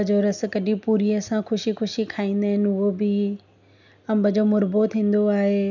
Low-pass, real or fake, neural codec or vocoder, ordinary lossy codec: 7.2 kHz; real; none; none